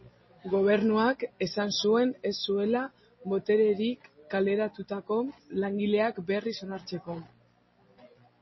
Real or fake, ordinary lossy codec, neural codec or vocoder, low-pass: real; MP3, 24 kbps; none; 7.2 kHz